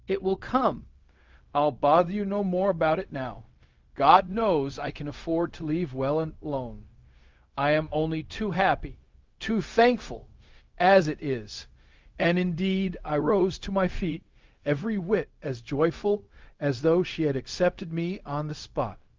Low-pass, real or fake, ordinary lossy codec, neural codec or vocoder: 7.2 kHz; fake; Opus, 32 kbps; codec, 16 kHz, 0.4 kbps, LongCat-Audio-Codec